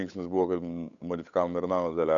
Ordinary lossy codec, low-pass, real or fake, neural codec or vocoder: MP3, 96 kbps; 7.2 kHz; fake; codec, 16 kHz, 8 kbps, FunCodec, trained on Chinese and English, 25 frames a second